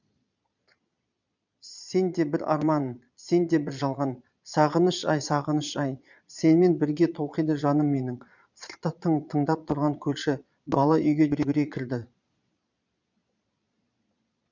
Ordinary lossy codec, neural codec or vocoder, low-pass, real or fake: none; vocoder, 22.05 kHz, 80 mel bands, Vocos; 7.2 kHz; fake